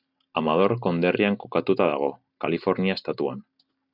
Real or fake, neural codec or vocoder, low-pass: real; none; 5.4 kHz